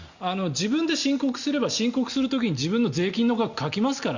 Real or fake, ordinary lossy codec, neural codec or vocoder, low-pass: real; Opus, 64 kbps; none; 7.2 kHz